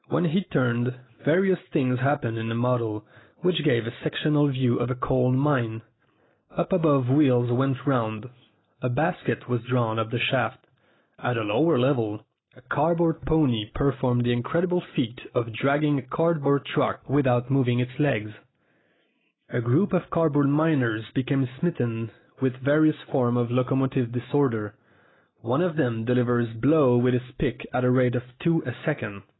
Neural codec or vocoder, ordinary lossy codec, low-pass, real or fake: none; AAC, 16 kbps; 7.2 kHz; real